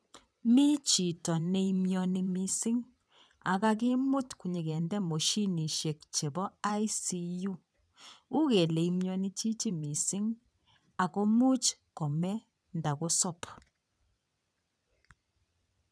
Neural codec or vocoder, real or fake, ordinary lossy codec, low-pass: vocoder, 22.05 kHz, 80 mel bands, WaveNeXt; fake; none; none